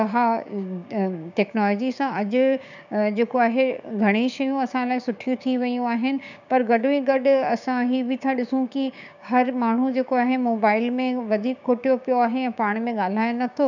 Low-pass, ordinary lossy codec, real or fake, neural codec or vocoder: 7.2 kHz; none; fake; codec, 16 kHz, 6 kbps, DAC